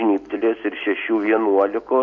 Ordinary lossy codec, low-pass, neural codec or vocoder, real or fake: AAC, 48 kbps; 7.2 kHz; none; real